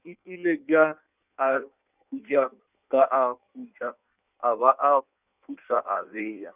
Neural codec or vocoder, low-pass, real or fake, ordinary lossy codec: codec, 16 kHz in and 24 kHz out, 1.1 kbps, FireRedTTS-2 codec; 3.6 kHz; fake; none